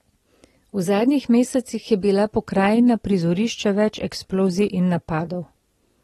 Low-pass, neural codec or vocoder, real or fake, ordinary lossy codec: 19.8 kHz; none; real; AAC, 32 kbps